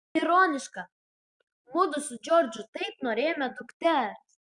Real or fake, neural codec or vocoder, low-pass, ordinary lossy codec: real; none; 10.8 kHz; Opus, 64 kbps